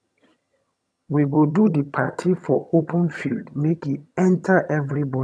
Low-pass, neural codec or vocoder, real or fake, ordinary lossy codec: none; vocoder, 22.05 kHz, 80 mel bands, HiFi-GAN; fake; none